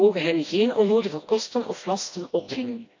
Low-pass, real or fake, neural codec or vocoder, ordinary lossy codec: 7.2 kHz; fake; codec, 16 kHz, 1 kbps, FreqCodec, smaller model; AAC, 48 kbps